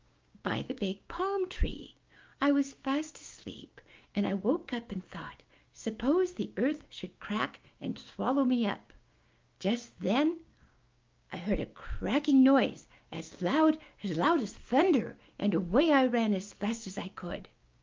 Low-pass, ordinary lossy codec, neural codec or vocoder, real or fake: 7.2 kHz; Opus, 24 kbps; codec, 16 kHz, 6 kbps, DAC; fake